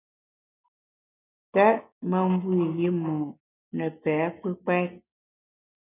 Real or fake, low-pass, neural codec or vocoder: real; 3.6 kHz; none